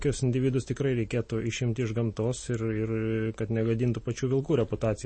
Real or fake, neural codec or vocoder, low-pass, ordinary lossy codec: real; none; 9.9 kHz; MP3, 32 kbps